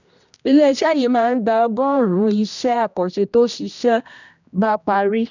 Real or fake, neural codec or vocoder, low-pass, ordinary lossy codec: fake; codec, 16 kHz, 1 kbps, X-Codec, HuBERT features, trained on general audio; 7.2 kHz; none